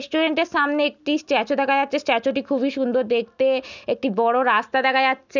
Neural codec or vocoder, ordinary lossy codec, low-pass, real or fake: none; none; 7.2 kHz; real